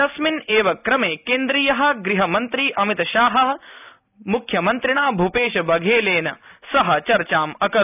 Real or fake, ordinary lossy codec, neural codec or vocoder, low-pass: real; none; none; 3.6 kHz